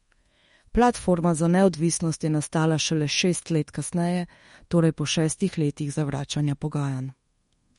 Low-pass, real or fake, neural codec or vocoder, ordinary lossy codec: 10.8 kHz; fake; codec, 24 kHz, 1.2 kbps, DualCodec; MP3, 48 kbps